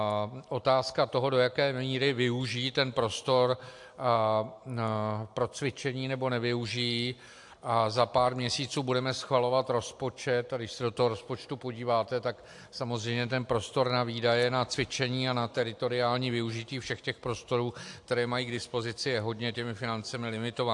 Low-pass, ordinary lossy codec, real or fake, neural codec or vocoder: 10.8 kHz; AAC, 64 kbps; real; none